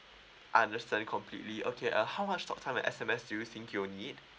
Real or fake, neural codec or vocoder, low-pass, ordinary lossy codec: real; none; none; none